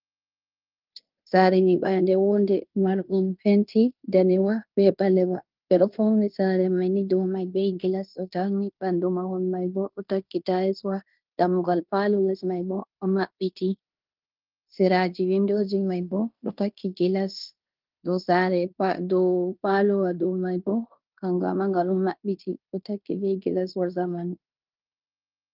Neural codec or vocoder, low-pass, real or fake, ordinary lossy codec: codec, 16 kHz in and 24 kHz out, 0.9 kbps, LongCat-Audio-Codec, fine tuned four codebook decoder; 5.4 kHz; fake; Opus, 32 kbps